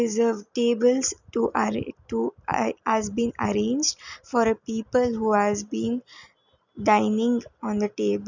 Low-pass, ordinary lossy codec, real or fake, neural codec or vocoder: 7.2 kHz; none; real; none